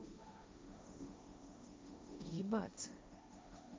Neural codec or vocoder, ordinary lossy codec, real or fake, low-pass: codec, 16 kHz, 1.1 kbps, Voila-Tokenizer; none; fake; none